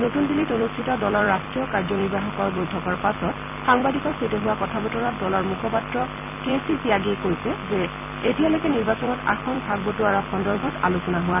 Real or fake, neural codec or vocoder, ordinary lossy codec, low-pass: fake; vocoder, 44.1 kHz, 128 mel bands every 256 samples, BigVGAN v2; none; 3.6 kHz